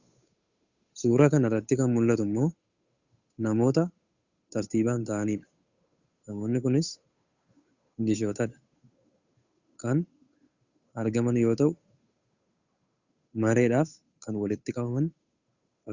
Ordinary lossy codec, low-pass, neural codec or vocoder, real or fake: Opus, 64 kbps; 7.2 kHz; codec, 16 kHz, 8 kbps, FunCodec, trained on Chinese and English, 25 frames a second; fake